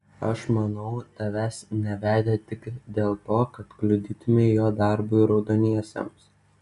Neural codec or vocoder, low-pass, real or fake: none; 10.8 kHz; real